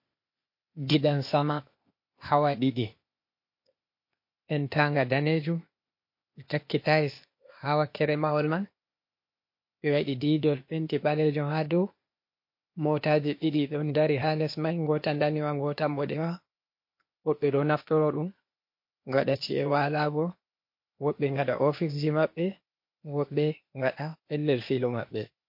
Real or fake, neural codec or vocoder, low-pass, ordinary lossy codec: fake; codec, 16 kHz, 0.8 kbps, ZipCodec; 5.4 kHz; MP3, 32 kbps